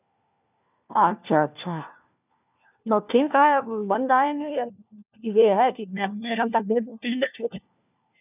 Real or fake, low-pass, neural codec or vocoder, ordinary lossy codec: fake; 3.6 kHz; codec, 16 kHz, 1 kbps, FunCodec, trained on LibriTTS, 50 frames a second; none